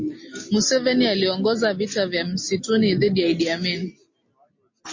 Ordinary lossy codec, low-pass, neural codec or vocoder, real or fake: MP3, 32 kbps; 7.2 kHz; none; real